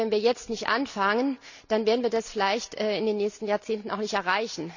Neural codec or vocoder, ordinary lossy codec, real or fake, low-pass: none; none; real; 7.2 kHz